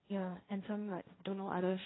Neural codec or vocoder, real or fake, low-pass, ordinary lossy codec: codec, 16 kHz, 1.1 kbps, Voila-Tokenizer; fake; 7.2 kHz; AAC, 16 kbps